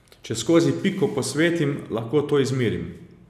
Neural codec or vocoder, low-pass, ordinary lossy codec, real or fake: none; 14.4 kHz; none; real